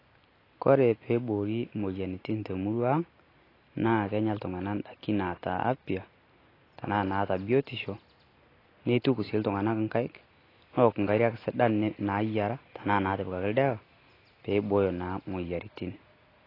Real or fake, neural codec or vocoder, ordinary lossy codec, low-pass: real; none; AAC, 24 kbps; 5.4 kHz